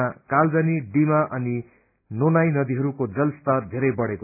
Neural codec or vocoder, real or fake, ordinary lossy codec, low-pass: none; real; AAC, 32 kbps; 3.6 kHz